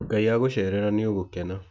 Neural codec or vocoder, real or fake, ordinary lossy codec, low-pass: none; real; none; none